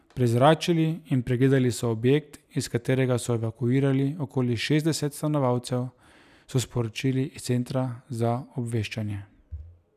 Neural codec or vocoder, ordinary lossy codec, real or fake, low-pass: none; none; real; 14.4 kHz